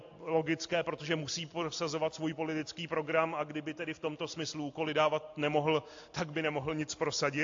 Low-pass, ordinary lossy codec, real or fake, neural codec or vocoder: 7.2 kHz; AAC, 48 kbps; real; none